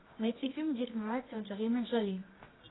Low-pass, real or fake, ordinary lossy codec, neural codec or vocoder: 7.2 kHz; fake; AAC, 16 kbps; codec, 24 kHz, 0.9 kbps, WavTokenizer, medium music audio release